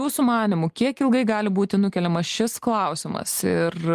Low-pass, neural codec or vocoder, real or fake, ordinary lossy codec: 14.4 kHz; none; real; Opus, 24 kbps